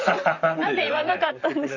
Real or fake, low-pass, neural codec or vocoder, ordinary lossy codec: fake; 7.2 kHz; vocoder, 44.1 kHz, 128 mel bands, Pupu-Vocoder; none